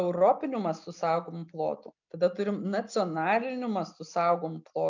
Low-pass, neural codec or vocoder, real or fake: 7.2 kHz; none; real